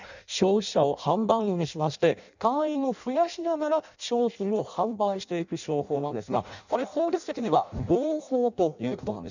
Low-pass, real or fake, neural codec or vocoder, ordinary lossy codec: 7.2 kHz; fake; codec, 16 kHz in and 24 kHz out, 0.6 kbps, FireRedTTS-2 codec; none